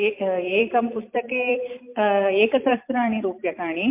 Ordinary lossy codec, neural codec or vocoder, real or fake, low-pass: MP3, 32 kbps; vocoder, 44.1 kHz, 128 mel bands every 256 samples, BigVGAN v2; fake; 3.6 kHz